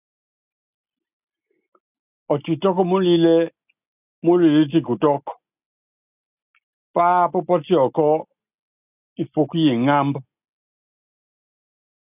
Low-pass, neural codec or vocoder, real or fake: 3.6 kHz; none; real